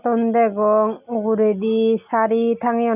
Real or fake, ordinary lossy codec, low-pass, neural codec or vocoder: real; none; 3.6 kHz; none